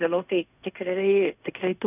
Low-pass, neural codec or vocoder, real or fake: 3.6 kHz; codec, 16 kHz in and 24 kHz out, 0.4 kbps, LongCat-Audio-Codec, fine tuned four codebook decoder; fake